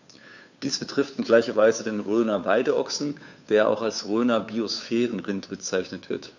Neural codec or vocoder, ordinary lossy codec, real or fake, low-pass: codec, 16 kHz, 2 kbps, FunCodec, trained on Chinese and English, 25 frames a second; none; fake; 7.2 kHz